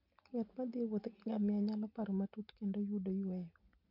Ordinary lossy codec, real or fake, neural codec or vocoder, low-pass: none; real; none; 5.4 kHz